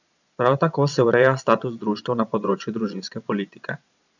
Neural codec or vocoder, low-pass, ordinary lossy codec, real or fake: vocoder, 22.05 kHz, 80 mel bands, Vocos; 7.2 kHz; none; fake